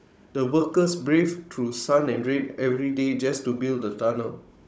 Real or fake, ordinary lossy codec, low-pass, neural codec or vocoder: fake; none; none; codec, 16 kHz, 16 kbps, FunCodec, trained on Chinese and English, 50 frames a second